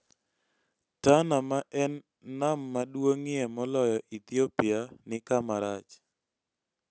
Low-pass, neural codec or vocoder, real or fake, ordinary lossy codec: none; none; real; none